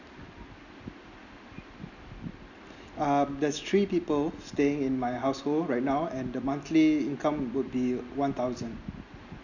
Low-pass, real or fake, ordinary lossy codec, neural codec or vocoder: 7.2 kHz; real; none; none